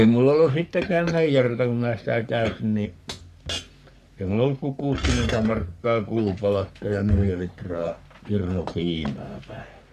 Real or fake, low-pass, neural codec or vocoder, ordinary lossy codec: fake; 14.4 kHz; codec, 44.1 kHz, 3.4 kbps, Pupu-Codec; none